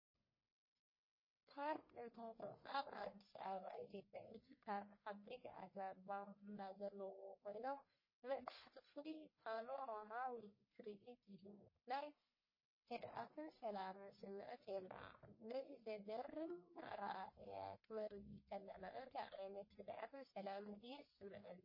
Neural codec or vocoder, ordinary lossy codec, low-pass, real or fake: codec, 44.1 kHz, 1.7 kbps, Pupu-Codec; MP3, 24 kbps; 5.4 kHz; fake